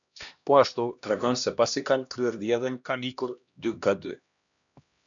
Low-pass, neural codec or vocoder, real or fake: 7.2 kHz; codec, 16 kHz, 1 kbps, X-Codec, HuBERT features, trained on LibriSpeech; fake